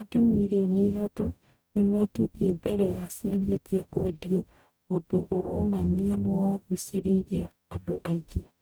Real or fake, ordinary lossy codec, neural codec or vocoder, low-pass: fake; none; codec, 44.1 kHz, 0.9 kbps, DAC; none